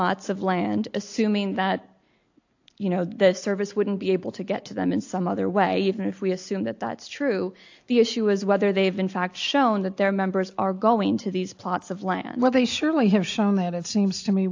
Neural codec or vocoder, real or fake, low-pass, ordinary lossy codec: none; real; 7.2 kHz; AAC, 48 kbps